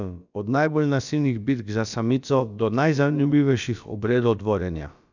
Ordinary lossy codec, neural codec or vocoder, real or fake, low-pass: none; codec, 16 kHz, about 1 kbps, DyCAST, with the encoder's durations; fake; 7.2 kHz